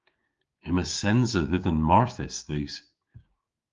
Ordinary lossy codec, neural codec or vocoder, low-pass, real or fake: Opus, 32 kbps; codec, 16 kHz, 6 kbps, DAC; 7.2 kHz; fake